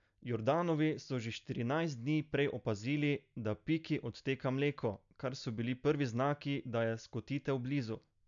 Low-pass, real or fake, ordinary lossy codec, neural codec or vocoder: 7.2 kHz; real; none; none